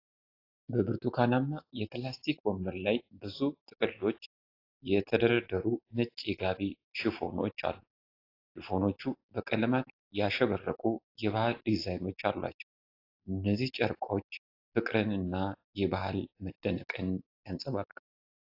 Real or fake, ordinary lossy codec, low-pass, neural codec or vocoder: real; AAC, 32 kbps; 5.4 kHz; none